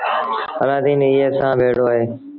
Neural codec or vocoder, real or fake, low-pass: none; real; 5.4 kHz